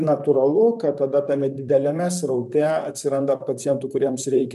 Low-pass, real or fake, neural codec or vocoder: 14.4 kHz; fake; vocoder, 44.1 kHz, 128 mel bands, Pupu-Vocoder